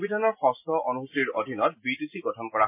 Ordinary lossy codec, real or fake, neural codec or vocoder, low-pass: MP3, 24 kbps; real; none; 3.6 kHz